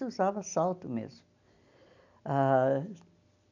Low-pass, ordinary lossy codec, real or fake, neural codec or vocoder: 7.2 kHz; none; real; none